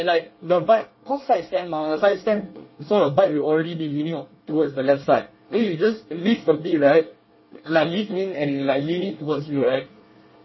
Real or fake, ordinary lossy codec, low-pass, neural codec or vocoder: fake; MP3, 24 kbps; 7.2 kHz; codec, 24 kHz, 1 kbps, SNAC